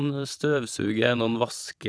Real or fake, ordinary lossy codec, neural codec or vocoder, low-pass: fake; none; vocoder, 22.05 kHz, 80 mel bands, WaveNeXt; none